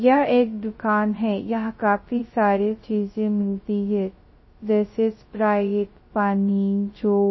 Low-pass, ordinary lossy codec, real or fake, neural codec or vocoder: 7.2 kHz; MP3, 24 kbps; fake; codec, 16 kHz, 0.2 kbps, FocalCodec